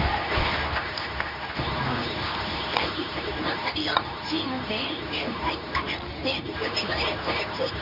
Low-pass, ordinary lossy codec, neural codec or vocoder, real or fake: 5.4 kHz; none; codec, 24 kHz, 0.9 kbps, WavTokenizer, medium speech release version 2; fake